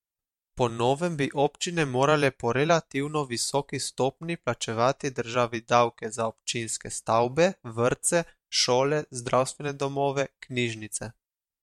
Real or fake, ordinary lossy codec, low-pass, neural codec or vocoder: fake; MP3, 64 kbps; 19.8 kHz; vocoder, 44.1 kHz, 128 mel bands every 512 samples, BigVGAN v2